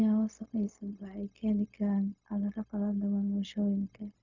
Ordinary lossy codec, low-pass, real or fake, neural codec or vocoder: none; 7.2 kHz; fake; codec, 16 kHz, 0.4 kbps, LongCat-Audio-Codec